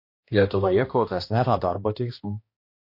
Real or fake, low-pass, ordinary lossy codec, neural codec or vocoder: fake; 5.4 kHz; MP3, 32 kbps; codec, 16 kHz, 1 kbps, X-Codec, HuBERT features, trained on balanced general audio